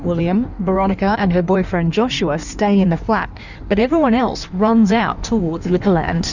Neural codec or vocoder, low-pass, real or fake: codec, 16 kHz in and 24 kHz out, 1.1 kbps, FireRedTTS-2 codec; 7.2 kHz; fake